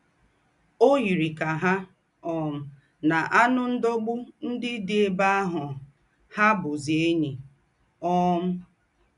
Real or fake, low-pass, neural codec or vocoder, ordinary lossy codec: real; 10.8 kHz; none; none